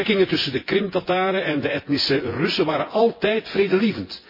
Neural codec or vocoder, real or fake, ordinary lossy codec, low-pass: vocoder, 24 kHz, 100 mel bands, Vocos; fake; MP3, 24 kbps; 5.4 kHz